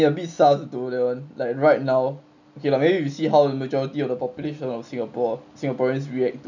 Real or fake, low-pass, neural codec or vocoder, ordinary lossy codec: real; 7.2 kHz; none; none